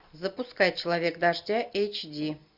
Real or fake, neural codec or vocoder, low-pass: real; none; 5.4 kHz